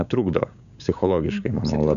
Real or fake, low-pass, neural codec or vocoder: fake; 7.2 kHz; codec, 16 kHz, 6 kbps, DAC